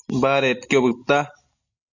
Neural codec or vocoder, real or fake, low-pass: none; real; 7.2 kHz